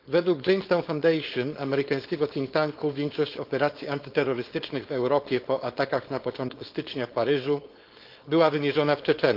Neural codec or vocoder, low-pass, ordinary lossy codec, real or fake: codec, 16 kHz, 4.8 kbps, FACodec; 5.4 kHz; Opus, 32 kbps; fake